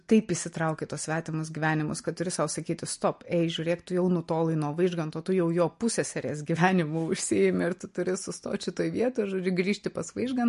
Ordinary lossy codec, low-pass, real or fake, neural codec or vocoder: MP3, 48 kbps; 10.8 kHz; real; none